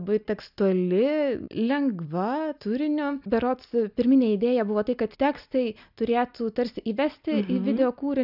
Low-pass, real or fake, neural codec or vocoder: 5.4 kHz; real; none